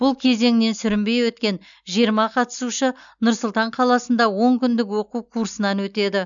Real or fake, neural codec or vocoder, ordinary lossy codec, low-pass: real; none; none; 7.2 kHz